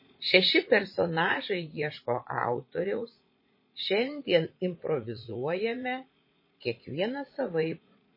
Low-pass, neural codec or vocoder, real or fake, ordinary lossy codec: 5.4 kHz; vocoder, 44.1 kHz, 80 mel bands, Vocos; fake; MP3, 24 kbps